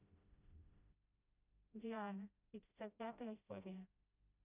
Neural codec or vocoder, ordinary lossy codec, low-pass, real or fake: codec, 16 kHz, 0.5 kbps, FreqCodec, smaller model; none; 3.6 kHz; fake